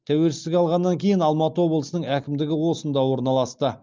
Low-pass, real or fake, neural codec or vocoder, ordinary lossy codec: 7.2 kHz; real; none; Opus, 32 kbps